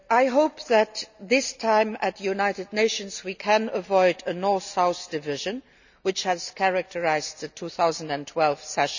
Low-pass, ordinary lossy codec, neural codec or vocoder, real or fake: 7.2 kHz; none; none; real